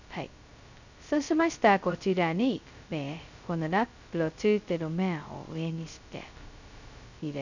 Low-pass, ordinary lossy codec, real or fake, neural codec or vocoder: 7.2 kHz; none; fake; codec, 16 kHz, 0.2 kbps, FocalCodec